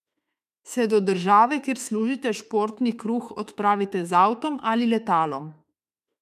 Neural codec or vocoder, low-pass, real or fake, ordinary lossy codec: autoencoder, 48 kHz, 32 numbers a frame, DAC-VAE, trained on Japanese speech; 14.4 kHz; fake; none